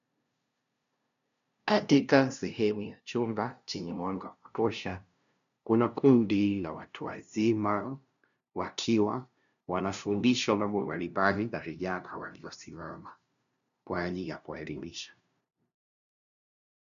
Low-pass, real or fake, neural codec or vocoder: 7.2 kHz; fake; codec, 16 kHz, 0.5 kbps, FunCodec, trained on LibriTTS, 25 frames a second